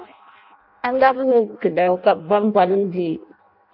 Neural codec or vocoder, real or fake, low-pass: codec, 16 kHz in and 24 kHz out, 0.6 kbps, FireRedTTS-2 codec; fake; 5.4 kHz